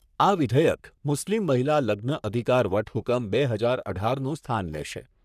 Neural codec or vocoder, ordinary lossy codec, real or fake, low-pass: codec, 44.1 kHz, 3.4 kbps, Pupu-Codec; none; fake; 14.4 kHz